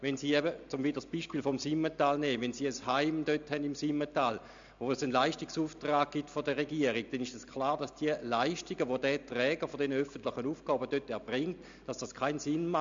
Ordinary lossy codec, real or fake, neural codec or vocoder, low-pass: MP3, 64 kbps; real; none; 7.2 kHz